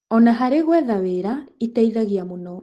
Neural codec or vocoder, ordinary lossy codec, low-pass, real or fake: none; Opus, 16 kbps; 14.4 kHz; real